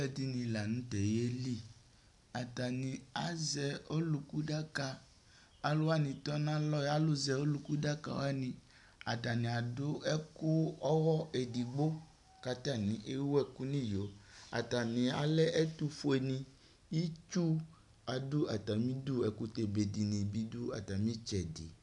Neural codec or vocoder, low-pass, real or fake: none; 10.8 kHz; real